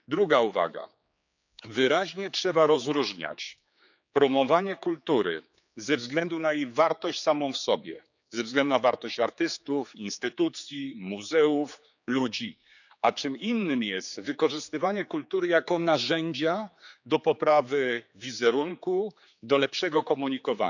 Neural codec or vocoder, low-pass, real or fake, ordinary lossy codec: codec, 16 kHz, 4 kbps, X-Codec, HuBERT features, trained on general audio; 7.2 kHz; fake; none